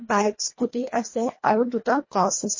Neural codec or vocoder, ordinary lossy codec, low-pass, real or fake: codec, 24 kHz, 1.5 kbps, HILCodec; MP3, 32 kbps; 7.2 kHz; fake